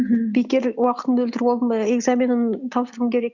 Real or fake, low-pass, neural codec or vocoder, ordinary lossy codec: real; 7.2 kHz; none; Opus, 64 kbps